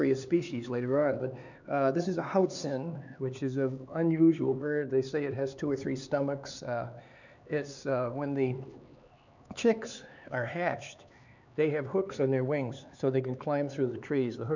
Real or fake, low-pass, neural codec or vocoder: fake; 7.2 kHz; codec, 16 kHz, 4 kbps, X-Codec, HuBERT features, trained on LibriSpeech